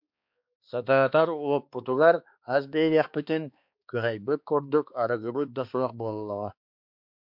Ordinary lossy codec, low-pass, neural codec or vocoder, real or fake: MP3, 48 kbps; 5.4 kHz; codec, 16 kHz, 2 kbps, X-Codec, HuBERT features, trained on balanced general audio; fake